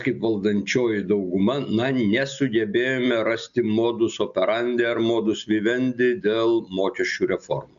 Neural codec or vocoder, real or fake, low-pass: none; real; 7.2 kHz